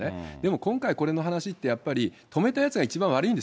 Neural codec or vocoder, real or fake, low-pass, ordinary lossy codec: none; real; none; none